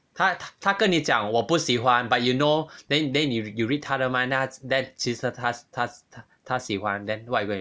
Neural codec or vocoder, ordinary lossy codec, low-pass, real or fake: none; none; none; real